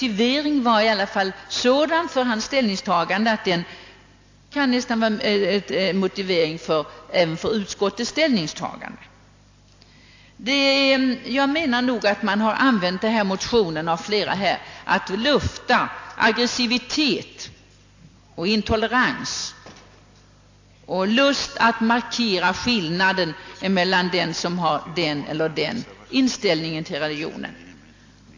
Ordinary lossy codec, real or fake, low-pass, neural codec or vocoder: AAC, 48 kbps; real; 7.2 kHz; none